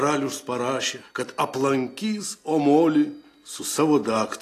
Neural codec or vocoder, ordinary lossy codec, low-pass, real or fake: none; AAC, 48 kbps; 14.4 kHz; real